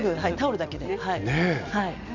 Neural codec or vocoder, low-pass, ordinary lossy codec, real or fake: none; 7.2 kHz; none; real